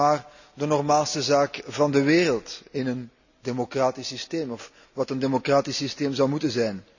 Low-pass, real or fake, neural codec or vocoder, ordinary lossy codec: 7.2 kHz; real; none; none